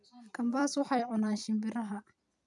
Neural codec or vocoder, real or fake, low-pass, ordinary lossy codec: vocoder, 44.1 kHz, 128 mel bands every 256 samples, BigVGAN v2; fake; 10.8 kHz; none